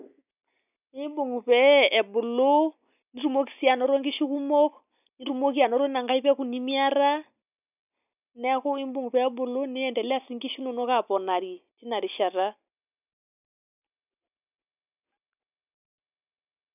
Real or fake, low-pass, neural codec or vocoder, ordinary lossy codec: real; 3.6 kHz; none; none